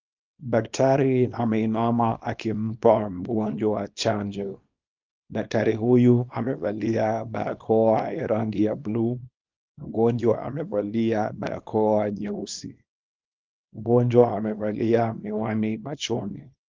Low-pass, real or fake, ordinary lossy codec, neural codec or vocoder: 7.2 kHz; fake; Opus, 24 kbps; codec, 24 kHz, 0.9 kbps, WavTokenizer, small release